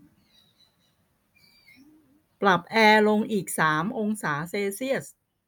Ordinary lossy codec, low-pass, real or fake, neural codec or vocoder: none; none; real; none